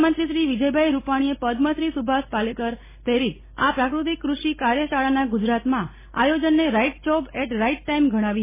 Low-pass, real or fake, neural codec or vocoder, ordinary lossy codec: 3.6 kHz; real; none; MP3, 16 kbps